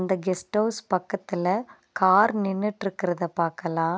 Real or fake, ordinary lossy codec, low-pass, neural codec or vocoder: real; none; none; none